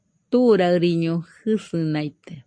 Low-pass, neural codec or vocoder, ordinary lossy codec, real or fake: 9.9 kHz; none; MP3, 64 kbps; real